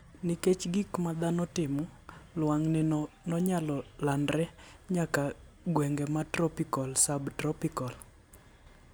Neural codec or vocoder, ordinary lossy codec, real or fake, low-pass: none; none; real; none